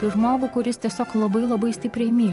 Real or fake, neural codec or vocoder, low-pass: fake; vocoder, 24 kHz, 100 mel bands, Vocos; 10.8 kHz